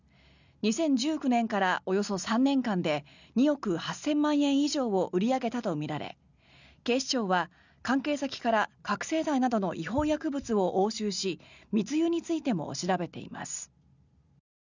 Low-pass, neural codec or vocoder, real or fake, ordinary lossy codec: 7.2 kHz; none; real; none